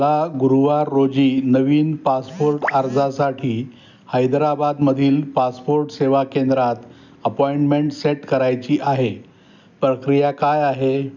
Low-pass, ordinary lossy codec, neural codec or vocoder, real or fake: 7.2 kHz; none; none; real